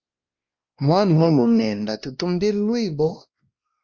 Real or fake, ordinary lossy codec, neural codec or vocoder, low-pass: fake; Opus, 32 kbps; codec, 16 kHz, 1 kbps, X-Codec, WavLM features, trained on Multilingual LibriSpeech; 7.2 kHz